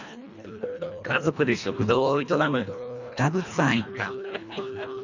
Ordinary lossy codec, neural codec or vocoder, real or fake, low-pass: none; codec, 24 kHz, 1.5 kbps, HILCodec; fake; 7.2 kHz